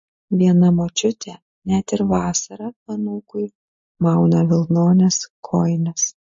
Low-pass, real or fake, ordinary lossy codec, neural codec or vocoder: 7.2 kHz; real; MP3, 32 kbps; none